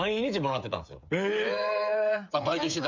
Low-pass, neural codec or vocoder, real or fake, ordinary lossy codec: 7.2 kHz; codec, 16 kHz, 8 kbps, FreqCodec, smaller model; fake; MP3, 64 kbps